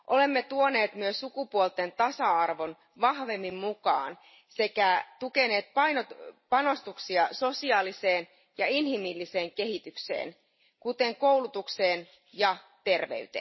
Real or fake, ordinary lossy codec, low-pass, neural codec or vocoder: real; MP3, 24 kbps; 7.2 kHz; none